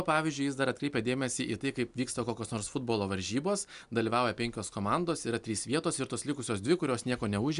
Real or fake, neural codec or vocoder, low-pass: real; none; 10.8 kHz